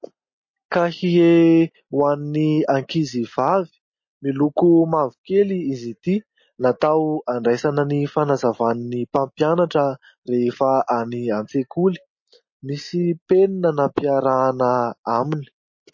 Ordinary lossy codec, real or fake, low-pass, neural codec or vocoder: MP3, 32 kbps; real; 7.2 kHz; none